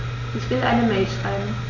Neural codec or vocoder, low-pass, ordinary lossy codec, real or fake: none; 7.2 kHz; none; real